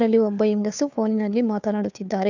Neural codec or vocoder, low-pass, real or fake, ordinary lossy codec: codec, 16 kHz, 2 kbps, FunCodec, trained on Chinese and English, 25 frames a second; 7.2 kHz; fake; none